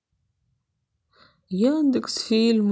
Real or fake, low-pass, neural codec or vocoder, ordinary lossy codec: real; none; none; none